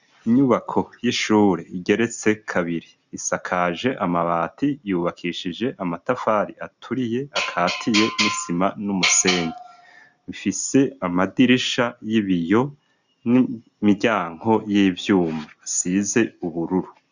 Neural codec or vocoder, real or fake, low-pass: none; real; 7.2 kHz